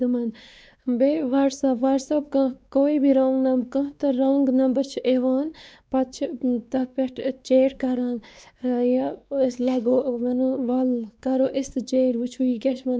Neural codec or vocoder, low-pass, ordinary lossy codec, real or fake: codec, 16 kHz, 2 kbps, X-Codec, WavLM features, trained on Multilingual LibriSpeech; none; none; fake